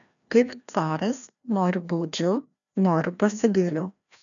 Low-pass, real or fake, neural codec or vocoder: 7.2 kHz; fake; codec, 16 kHz, 1 kbps, FreqCodec, larger model